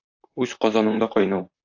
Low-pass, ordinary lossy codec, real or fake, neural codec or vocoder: 7.2 kHz; AAC, 48 kbps; fake; vocoder, 44.1 kHz, 128 mel bands, Pupu-Vocoder